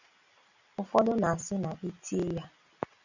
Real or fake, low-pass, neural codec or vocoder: real; 7.2 kHz; none